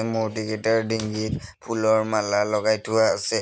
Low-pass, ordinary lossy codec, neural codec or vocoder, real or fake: none; none; none; real